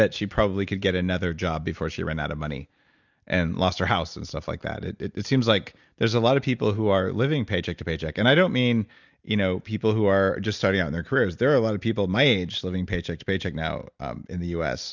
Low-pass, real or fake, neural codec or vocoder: 7.2 kHz; real; none